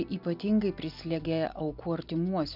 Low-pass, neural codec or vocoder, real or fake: 5.4 kHz; none; real